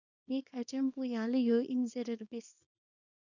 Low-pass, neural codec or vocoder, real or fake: 7.2 kHz; codec, 24 kHz, 0.9 kbps, WavTokenizer, small release; fake